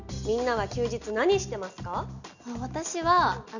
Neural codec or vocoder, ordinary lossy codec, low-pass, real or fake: none; none; 7.2 kHz; real